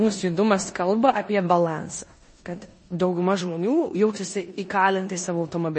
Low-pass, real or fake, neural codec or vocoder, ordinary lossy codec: 9.9 kHz; fake; codec, 16 kHz in and 24 kHz out, 0.9 kbps, LongCat-Audio-Codec, four codebook decoder; MP3, 32 kbps